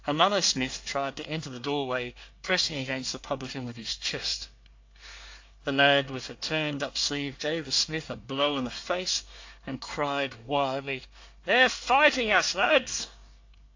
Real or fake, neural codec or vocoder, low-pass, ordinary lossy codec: fake; codec, 24 kHz, 1 kbps, SNAC; 7.2 kHz; AAC, 48 kbps